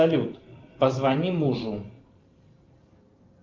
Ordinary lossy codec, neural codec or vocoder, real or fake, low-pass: Opus, 24 kbps; vocoder, 44.1 kHz, 128 mel bands every 512 samples, BigVGAN v2; fake; 7.2 kHz